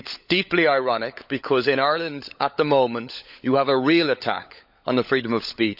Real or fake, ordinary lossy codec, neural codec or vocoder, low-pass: fake; none; codec, 16 kHz, 16 kbps, FunCodec, trained on LibriTTS, 50 frames a second; 5.4 kHz